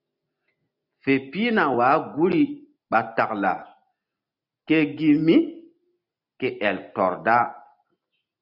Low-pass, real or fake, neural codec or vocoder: 5.4 kHz; real; none